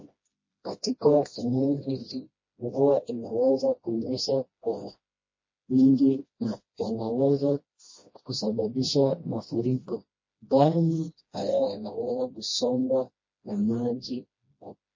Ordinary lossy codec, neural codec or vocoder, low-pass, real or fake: MP3, 32 kbps; codec, 16 kHz, 1 kbps, FreqCodec, smaller model; 7.2 kHz; fake